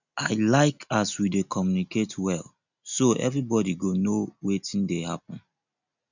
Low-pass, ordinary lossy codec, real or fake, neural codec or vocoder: 7.2 kHz; none; real; none